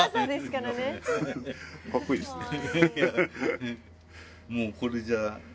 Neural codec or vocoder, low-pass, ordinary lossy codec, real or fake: none; none; none; real